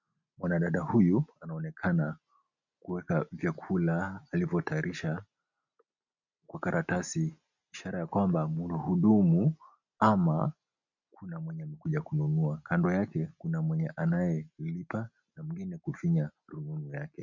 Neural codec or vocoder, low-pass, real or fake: none; 7.2 kHz; real